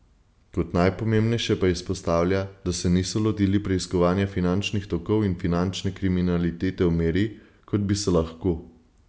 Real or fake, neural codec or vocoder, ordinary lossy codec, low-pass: real; none; none; none